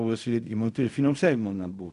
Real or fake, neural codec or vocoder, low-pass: fake; codec, 16 kHz in and 24 kHz out, 0.4 kbps, LongCat-Audio-Codec, fine tuned four codebook decoder; 10.8 kHz